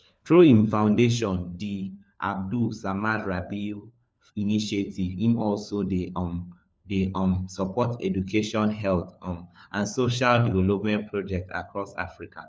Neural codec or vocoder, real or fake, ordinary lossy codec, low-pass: codec, 16 kHz, 4 kbps, FunCodec, trained on LibriTTS, 50 frames a second; fake; none; none